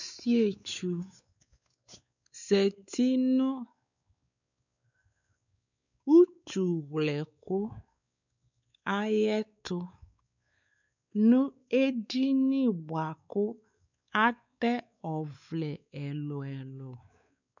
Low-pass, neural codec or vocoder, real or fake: 7.2 kHz; codec, 16 kHz, 4 kbps, X-Codec, WavLM features, trained on Multilingual LibriSpeech; fake